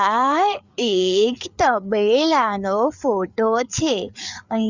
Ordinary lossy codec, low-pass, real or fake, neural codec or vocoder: Opus, 64 kbps; 7.2 kHz; fake; codec, 16 kHz, 4 kbps, FreqCodec, larger model